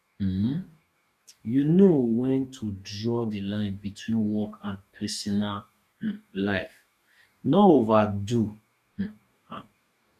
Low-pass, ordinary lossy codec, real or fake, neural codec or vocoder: 14.4 kHz; MP3, 96 kbps; fake; codec, 44.1 kHz, 2.6 kbps, DAC